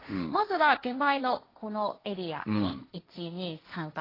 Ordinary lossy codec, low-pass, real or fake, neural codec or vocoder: AAC, 24 kbps; 5.4 kHz; fake; codec, 16 kHz, 1.1 kbps, Voila-Tokenizer